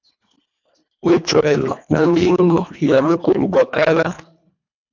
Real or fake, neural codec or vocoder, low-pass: fake; codec, 24 kHz, 1.5 kbps, HILCodec; 7.2 kHz